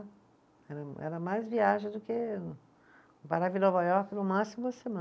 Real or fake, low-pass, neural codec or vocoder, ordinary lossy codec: real; none; none; none